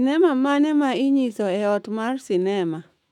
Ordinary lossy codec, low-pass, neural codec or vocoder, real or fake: none; 19.8 kHz; autoencoder, 48 kHz, 128 numbers a frame, DAC-VAE, trained on Japanese speech; fake